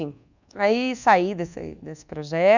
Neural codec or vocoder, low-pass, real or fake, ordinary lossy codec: codec, 24 kHz, 1.2 kbps, DualCodec; 7.2 kHz; fake; none